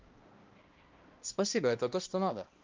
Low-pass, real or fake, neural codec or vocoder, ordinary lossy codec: 7.2 kHz; fake; codec, 16 kHz, 1 kbps, X-Codec, HuBERT features, trained on balanced general audio; Opus, 24 kbps